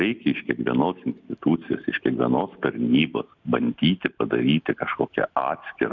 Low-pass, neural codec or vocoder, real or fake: 7.2 kHz; none; real